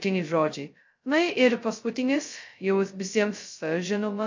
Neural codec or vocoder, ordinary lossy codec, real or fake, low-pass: codec, 16 kHz, 0.2 kbps, FocalCodec; MP3, 48 kbps; fake; 7.2 kHz